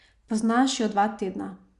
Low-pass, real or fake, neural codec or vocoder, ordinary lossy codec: 10.8 kHz; real; none; Opus, 64 kbps